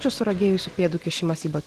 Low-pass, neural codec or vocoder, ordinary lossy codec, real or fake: 14.4 kHz; none; Opus, 16 kbps; real